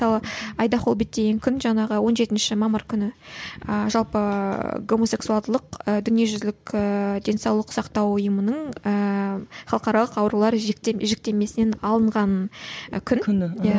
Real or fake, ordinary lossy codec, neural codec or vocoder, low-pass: real; none; none; none